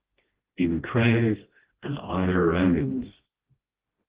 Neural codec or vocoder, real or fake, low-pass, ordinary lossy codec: codec, 16 kHz, 1 kbps, FreqCodec, smaller model; fake; 3.6 kHz; Opus, 16 kbps